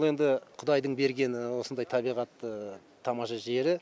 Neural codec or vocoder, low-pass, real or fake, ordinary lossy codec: none; none; real; none